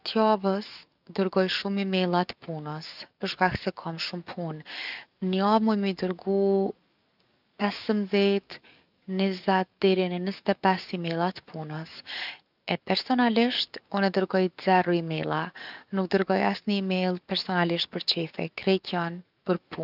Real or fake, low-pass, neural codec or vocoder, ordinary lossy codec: fake; 5.4 kHz; codec, 44.1 kHz, 7.8 kbps, DAC; none